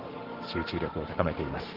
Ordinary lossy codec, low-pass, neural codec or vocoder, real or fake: Opus, 16 kbps; 5.4 kHz; codec, 44.1 kHz, 7.8 kbps, Pupu-Codec; fake